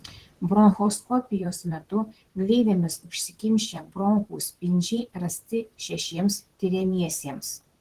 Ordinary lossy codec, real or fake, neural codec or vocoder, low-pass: Opus, 16 kbps; fake; vocoder, 44.1 kHz, 128 mel bands, Pupu-Vocoder; 14.4 kHz